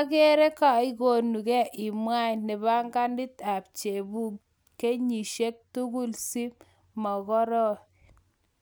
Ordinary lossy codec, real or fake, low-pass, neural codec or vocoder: none; real; none; none